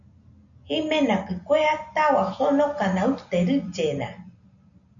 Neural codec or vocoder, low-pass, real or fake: none; 7.2 kHz; real